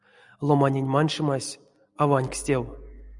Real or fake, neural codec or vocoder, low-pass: real; none; 10.8 kHz